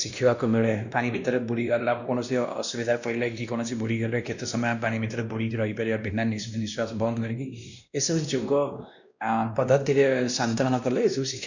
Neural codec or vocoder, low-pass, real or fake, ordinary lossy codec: codec, 16 kHz, 1 kbps, X-Codec, WavLM features, trained on Multilingual LibriSpeech; 7.2 kHz; fake; none